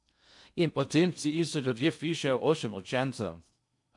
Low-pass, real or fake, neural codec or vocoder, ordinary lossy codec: 10.8 kHz; fake; codec, 16 kHz in and 24 kHz out, 0.6 kbps, FocalCodec, streaming, 2048 codes; MP3, 64 kbps